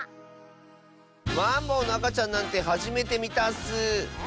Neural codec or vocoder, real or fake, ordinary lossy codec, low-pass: none; real; none; none